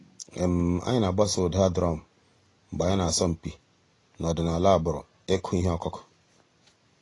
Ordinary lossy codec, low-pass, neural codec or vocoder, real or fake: AAC, 32 kbps; 10.8 kHz; none; real